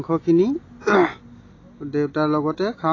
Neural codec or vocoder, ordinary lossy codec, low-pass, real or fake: none; AAC, 32 kbps; 7.2 kHz; real